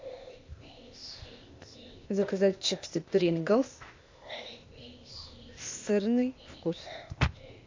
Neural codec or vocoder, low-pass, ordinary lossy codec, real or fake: codec, 16 kHz, 0.8 kbps, ZipCodec; 7.2 kHz; MP3, 64 kbps; fake